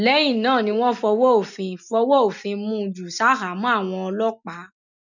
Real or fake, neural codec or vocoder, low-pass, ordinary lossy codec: real; none; 7.2 kHz; none